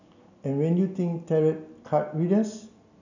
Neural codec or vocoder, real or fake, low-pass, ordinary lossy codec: none; real; 7.2 kHz; none